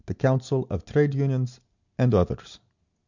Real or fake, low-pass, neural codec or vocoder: real; 7.2 kHz; none